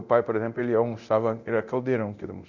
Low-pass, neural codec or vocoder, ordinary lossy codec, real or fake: 7.2 kHz; codec, 24 kHz, 0.9 kbps, DualCodec; none; fake